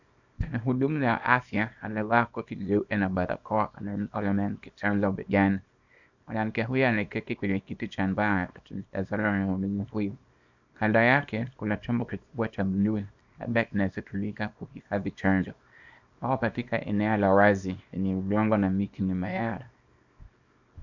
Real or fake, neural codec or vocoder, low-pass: fake; codec, 24 kHz, 0.9 kbps, WavTokenizer, small release; 7.2 kHz